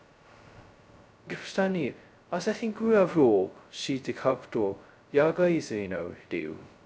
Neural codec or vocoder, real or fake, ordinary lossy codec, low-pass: codec, 16 kHz, 0.2 kbps, FocalCodec; fake; none; none